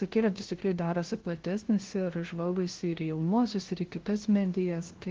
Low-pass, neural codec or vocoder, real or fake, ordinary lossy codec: 7.2 kHz; codec, 16 kHz, 0.5 kbps, FunCodec, trained on LibriTTS, 25 frames a second; fake; Opus, 16 kbps